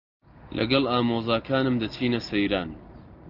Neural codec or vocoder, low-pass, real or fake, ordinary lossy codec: none; 5.4 kHz; real; Opus, 32 kbps